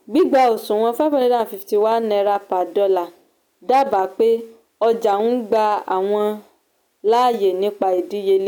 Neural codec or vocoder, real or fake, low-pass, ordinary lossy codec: none; real; none; none